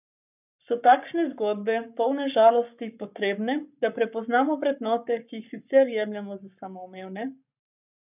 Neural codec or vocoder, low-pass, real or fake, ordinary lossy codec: codec, 44.1 kHz, 7.8 kbps, Pupu-Codec; 3.6 kHz; fake; none